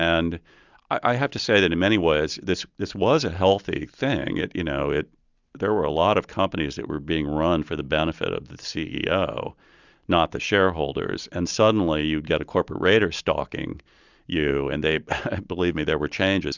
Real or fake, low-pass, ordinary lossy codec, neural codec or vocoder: real; 7.2 kHz; Opus, 64 kbps; none